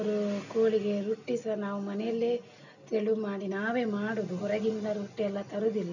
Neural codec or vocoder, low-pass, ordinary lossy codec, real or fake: none; 7.2 kHz; none; real